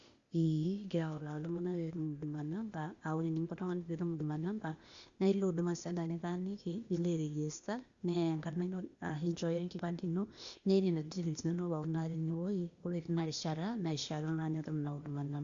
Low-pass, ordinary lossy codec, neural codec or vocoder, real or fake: 7.2 kHz; Opus, 64 kbps; codec, 16 kHz, 0.8 kbps, ZipCodec; fake